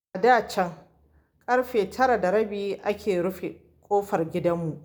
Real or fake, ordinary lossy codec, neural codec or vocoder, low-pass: real; none; none; none